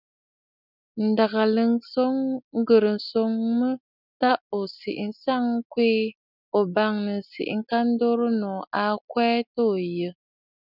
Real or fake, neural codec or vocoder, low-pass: real; none; 5.4 kHz